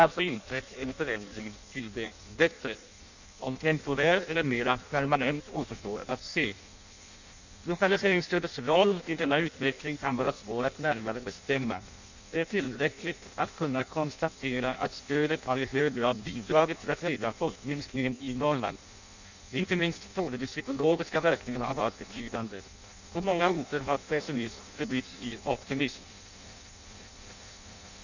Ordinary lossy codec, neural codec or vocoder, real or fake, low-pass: none; codec, 16 kHz in and 24 kHz out, 0.6 kbps, FireRedTTS-2 codec; fake; 7.2 kHz